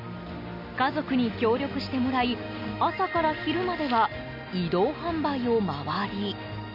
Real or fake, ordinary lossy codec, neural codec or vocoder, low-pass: real; none; none; 5.4 kHz